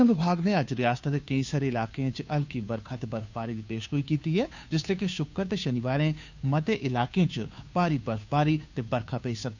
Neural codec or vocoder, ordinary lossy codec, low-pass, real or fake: codec, 16 kHz, 2 kbps, FunCodec, trained on Chinese and English, 25 frames a second; none; 7.2 kHz; fake